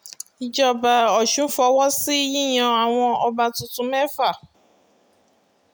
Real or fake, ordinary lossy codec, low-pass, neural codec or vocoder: real; none; none; none